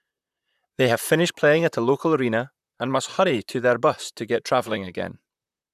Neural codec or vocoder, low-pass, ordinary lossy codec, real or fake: vocoder, 44.1 kHz, 128 mel bands, Pupu-Vocoder; 14.4 kHz; none; fake